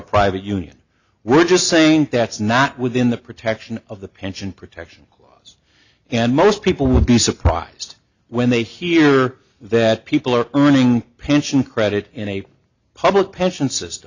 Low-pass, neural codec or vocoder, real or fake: 7.2 kHz; none; real